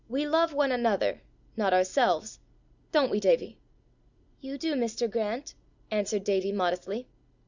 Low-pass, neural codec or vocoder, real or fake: 7.2 kHz; none; real